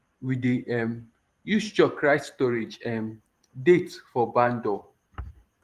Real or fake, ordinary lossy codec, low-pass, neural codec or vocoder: fake; Opus, 24 kbps; 14.4 kHz; vocoder, 44.1 kHz, 128 mel bands every 512 samples, BigVGAN v2